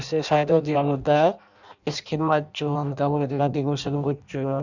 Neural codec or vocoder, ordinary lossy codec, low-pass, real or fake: codec, 16 kHz in and 24 kHz out, 0.6 kbps, FireRedTTS-2 codec; none; 7.2 kHz; fake